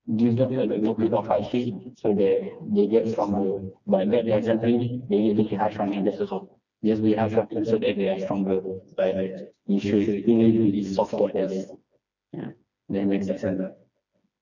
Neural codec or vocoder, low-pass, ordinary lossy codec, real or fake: codec, 16 kHz, 2 kbps, FreqCodec, smaller model; 7.2 kHz; none; fake